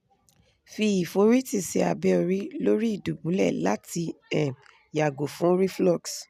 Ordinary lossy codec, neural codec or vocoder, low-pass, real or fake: none; none; 14.4 kHz; real